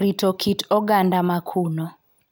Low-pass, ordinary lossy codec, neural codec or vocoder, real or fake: none; none; none; real